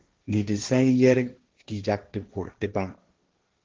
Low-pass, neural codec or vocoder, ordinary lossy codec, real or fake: 7.2 kHz; codec, 16 kHz, 1.1 kbps, Voila-Tokenizer; Opus, 16 kbps; fake